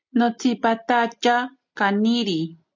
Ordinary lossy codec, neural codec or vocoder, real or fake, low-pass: MP3, 64 kbps; none; real; 7.2 kHz